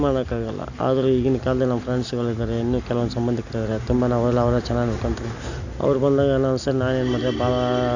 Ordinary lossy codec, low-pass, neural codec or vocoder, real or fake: none; 7.2 kHz; none; real